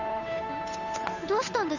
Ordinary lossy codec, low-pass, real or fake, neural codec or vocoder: none; 7.2 kHz; fake; codec, 16 kHz, 8 kbps, FunCodec, trained on Chinese and English, 25 frames a second